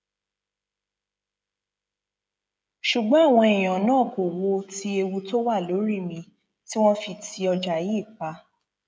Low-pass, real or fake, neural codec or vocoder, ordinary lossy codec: none; fake; codec, 16 kHz, 16 kbps, FreqCodec, smaller model; none